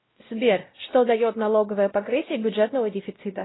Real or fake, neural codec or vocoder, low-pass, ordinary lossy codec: fake; codec, 16 kHz, 0.5 kbps, X-Codec, WavLM features, trained on Multilingual LibriSpeech; 7.2 kHz; AAC, 16 kbps